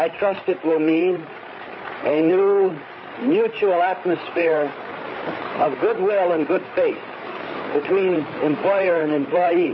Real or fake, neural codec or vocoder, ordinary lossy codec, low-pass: fake; codec, 16 kHz, 8 kbps, FreqCodec, larger model; MP3, 24 kbps; 7.2 kHz